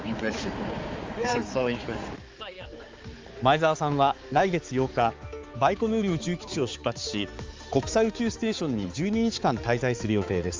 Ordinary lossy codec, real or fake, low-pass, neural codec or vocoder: Opus, 32 kbps; fake; 7.2 kHz; codec, 16 kHz, 4 kbps, X-Codec, HuBERT features, trained on balanced general audio